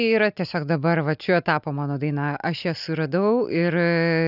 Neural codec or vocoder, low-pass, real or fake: none; 5.4 kHz; real